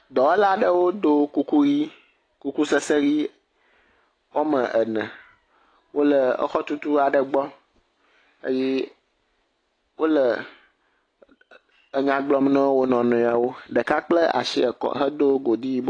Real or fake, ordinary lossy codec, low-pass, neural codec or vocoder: real; AAC, 32 kbps; 9.9 kHz; none